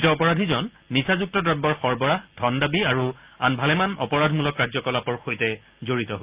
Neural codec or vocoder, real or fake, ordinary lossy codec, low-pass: none; real; Opus, 32 kbps; 3.6 kHz